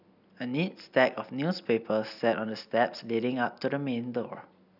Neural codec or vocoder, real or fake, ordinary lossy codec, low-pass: none; real; none; 5.4 kHz